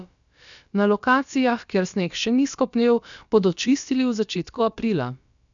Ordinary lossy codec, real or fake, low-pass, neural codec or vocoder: none; fake; 7.2 kHz; codec, 16 kHz, about 1 kbps, DyCAST, with the encoder's durations